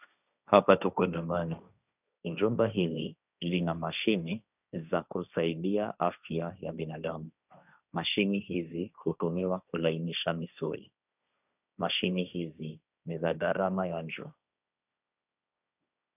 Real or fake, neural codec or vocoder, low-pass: fake; codec, 16 kHz, 1.1 kbps, Voila-Tokenizer; 3.6 kHz